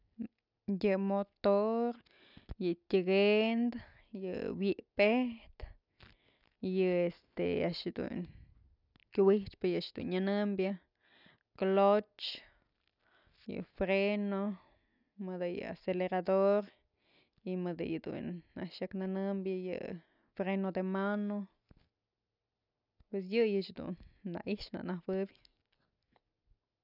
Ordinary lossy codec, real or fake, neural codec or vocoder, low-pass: none; real; none; 5.4 kHz